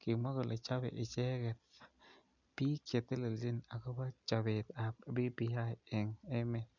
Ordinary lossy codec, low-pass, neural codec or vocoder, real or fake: none; 7.2 kHz; none; real